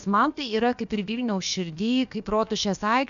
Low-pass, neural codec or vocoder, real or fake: 7.2 kHz; codec, 16 kHz, about 1 kbps, DyCAST, with the encoder's durations; fake